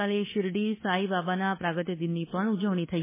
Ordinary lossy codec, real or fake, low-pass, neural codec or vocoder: MP3, 16 kbps; fake; 3.6 kHz; codec, 24 kHz, 1.2 kbps, DualCodec